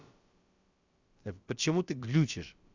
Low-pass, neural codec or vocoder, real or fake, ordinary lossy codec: 7.2 kHz; codec, 16 kHz, about 1 kbps, DyCAST, with the encoder's durations; fake; Opus, 64 kbps